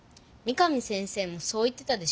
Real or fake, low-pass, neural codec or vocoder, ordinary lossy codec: real; none; none; none